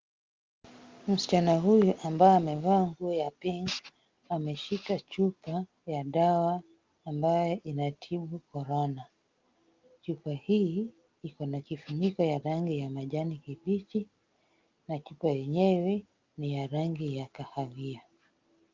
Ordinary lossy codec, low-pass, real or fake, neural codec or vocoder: Opus, 32 kbps; 7.2 kHz; real; none